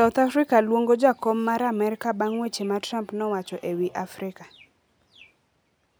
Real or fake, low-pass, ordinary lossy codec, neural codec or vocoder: real; none; none; none